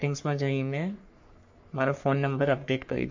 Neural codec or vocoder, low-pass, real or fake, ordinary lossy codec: codec, 44.1 kHz, 3.4 kbps, Pupu-Codec; 7.2 kHz; fake; MP3, 48 kbps